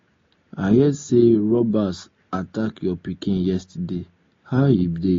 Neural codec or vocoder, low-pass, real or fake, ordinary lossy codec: none; 7.2 kHz; real; AAC, 32 kbps